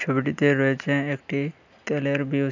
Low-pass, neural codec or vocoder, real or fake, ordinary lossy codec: 7.2 kHz; none; real; none